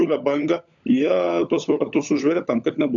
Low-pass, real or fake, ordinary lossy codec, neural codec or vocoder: 7.2 kHz; fake; AAC, 64 kbps; codec, 16 kHz, 16 kbps, FunCodec, trained on LibriTTS, 50 frames a second